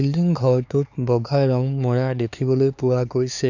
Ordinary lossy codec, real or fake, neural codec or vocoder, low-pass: none; fake; codec, 16 kHz, 4 kbps, X-Codec, HuBERT features, trained on LibriSpeech; 7.2 kHz